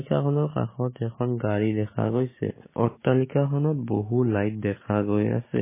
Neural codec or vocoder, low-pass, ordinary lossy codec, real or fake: codec, 16 kHz, 6 kbps, DAC; 3.6 kHz; MP3, 16 kbps; fake